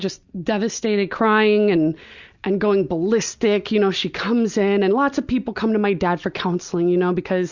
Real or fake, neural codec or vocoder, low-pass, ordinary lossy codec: real; none; 7.2 kHz; Opus, 64 kbps